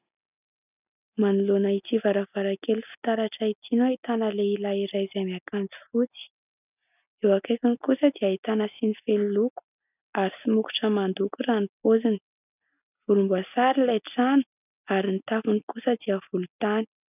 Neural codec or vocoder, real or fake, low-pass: none; real; 3.6 kHz